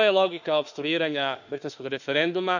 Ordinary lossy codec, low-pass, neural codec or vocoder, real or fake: none; 7.2 kHz; autoencoder, 48 kHz, 32 numbers a frame, DAC-VAE, trained on Japanese speech; fake